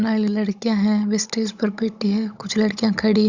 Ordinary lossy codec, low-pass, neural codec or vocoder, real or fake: Opus, 64 kbps; 7.2 kHz; codec, 16 kHz, 16 kbps, FunCodec, trained on Chinese and English, 50 frames a second; fake